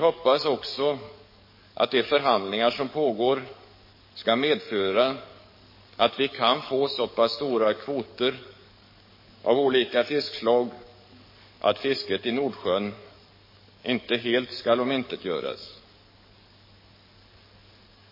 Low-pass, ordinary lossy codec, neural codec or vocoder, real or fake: 5.4 kHz; MP3, 24 kbps; codec, 44.1 kHz, 7.8 kbps, DAC; fake